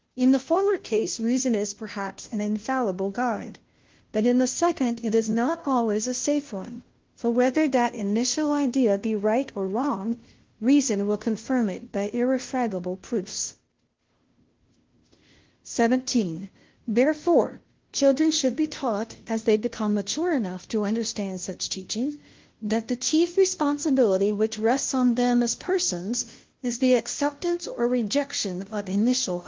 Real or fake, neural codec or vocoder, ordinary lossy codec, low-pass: fake; codec, 16 kHz, 0.5 kbps, FunCodec, trained on Chinese and English, 25 frames a second; Opus, 16 kbps; 7.2 kHz